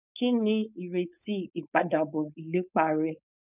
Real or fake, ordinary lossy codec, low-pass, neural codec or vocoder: fake; none; 3.6 kHz; codec, 16 kHz, 4.8 kbps, FACodec